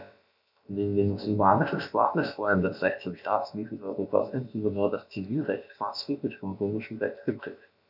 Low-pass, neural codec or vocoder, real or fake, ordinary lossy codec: 5.4 kHz; codec, 16 kHz, about 1 kbps, DyCAST, with the encoder's durations; fake; none